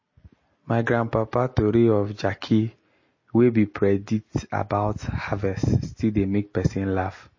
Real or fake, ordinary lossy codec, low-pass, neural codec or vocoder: real; MP3, 32 kbps; 7.2 kHz; none